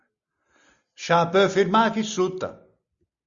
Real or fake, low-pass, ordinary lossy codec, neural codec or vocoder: real; 7.2 kHz; Opus, 64 kbps; none